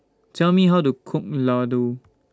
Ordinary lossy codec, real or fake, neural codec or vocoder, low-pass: none; real; none; none